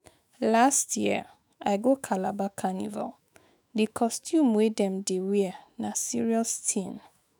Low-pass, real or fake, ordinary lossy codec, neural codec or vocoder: none; fake; none; autoencoder, 48 kHz, 128 numbers a frame, DAC-VAE, trained on Japanese speech